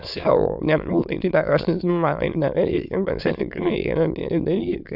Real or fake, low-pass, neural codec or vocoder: fake; 5.4 kHz; autoencoder, 22.05 kHz, a latent of 192 numbers a frame, VITS, trained on many speakers